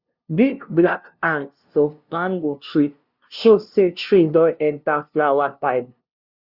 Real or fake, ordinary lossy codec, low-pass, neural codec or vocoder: fake; Opus, 64 kbps; 5.4 kHz; codec, 16 kHz, 0.5 kbps, FunCodec, trained on LibriTTS, 25 frames a second